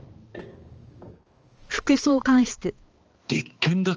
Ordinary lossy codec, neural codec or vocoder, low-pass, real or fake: Opus, 24 kbps; codec, 16 kHz, 2 kbps, X-Codec, HuBERT features, trained on balanced general audio; 7.2 kHz; fake